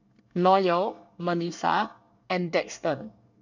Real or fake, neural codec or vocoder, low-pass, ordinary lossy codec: fake; codec, 24 kHz, 1 kbps, SNAC; 7.2 kHz; none